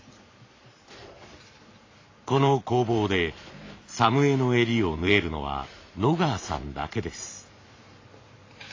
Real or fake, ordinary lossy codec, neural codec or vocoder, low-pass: fake; AAC, 32 kbps; vocoder, 44.1 kHz, 128 mel bands every 512 samples, BigVGAN v2; 7.2 kHz